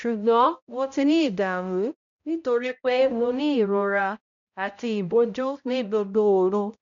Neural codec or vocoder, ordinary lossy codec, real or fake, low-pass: codec, 16 kHz, 0.5 kbps, X-Codec, HuBERT features, trained on balanced general audio; MP3, 64 kbps; fake; 7.2 kHz